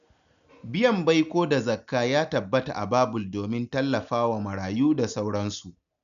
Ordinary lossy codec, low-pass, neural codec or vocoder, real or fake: none; 7.2 kHz; none; real